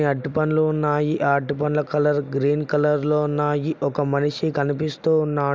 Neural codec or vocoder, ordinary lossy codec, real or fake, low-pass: none; none; real; none